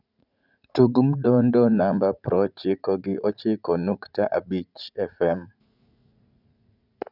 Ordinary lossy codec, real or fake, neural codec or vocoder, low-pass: none; fake; vocoder, 44.1 kHz, 80 mel bands, Vocos; 5.4 kHz